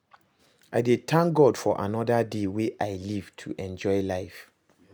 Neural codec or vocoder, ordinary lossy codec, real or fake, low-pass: none; none; real; none